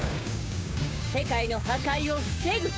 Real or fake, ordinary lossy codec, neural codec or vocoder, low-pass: fake; none; codec, 16 kHz, 6 kbps, DAC; none